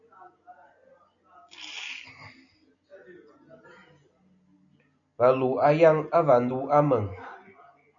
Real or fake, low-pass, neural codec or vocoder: real; 7.2 kHz; none